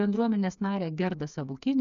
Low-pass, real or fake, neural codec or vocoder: 7.2 kHz; fake; codec, 16 kHz, 4 kbps, FreqCodec, smaller model